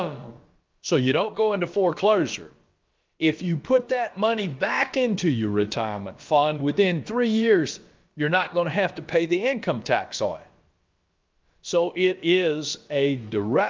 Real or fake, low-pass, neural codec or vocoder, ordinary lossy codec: fake; 7.2 kHz; codec, 16 kHz, about 1 kbps, DyCAST, with the encoder's durations; Opus, 24 kbps